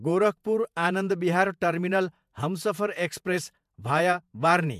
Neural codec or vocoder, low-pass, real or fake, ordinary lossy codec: vocoder, 48 kHz, 128 mel bands, Vocos; 14.4 kHz; fake; MP3, 96 kbps